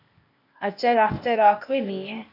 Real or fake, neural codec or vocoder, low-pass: fake; codec, 16 kHz, 0.8 kbps, ZipCodec; 5.4 kHz